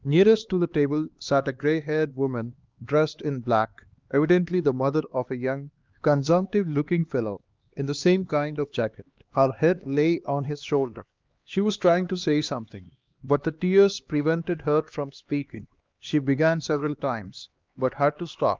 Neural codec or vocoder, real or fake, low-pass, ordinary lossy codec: codec, 16 kHz, 2 kbps, X-Codec, HuBERT features, trained on LibriSpeech; fake; 7.2 kHz; Opus, 24 kbps